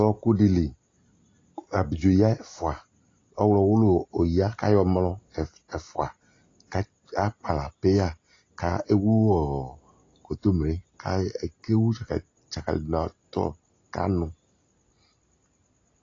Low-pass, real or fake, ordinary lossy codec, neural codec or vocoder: 7.2 kHz; real; AAC, 32 kbps; none